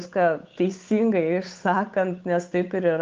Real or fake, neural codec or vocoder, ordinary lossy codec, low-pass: fake; codec, 16 kHz, 8 kbps, FunCodec, trained on Chinese and English, 25 frames a second; Opus, 32 kbps; 7.2 kHz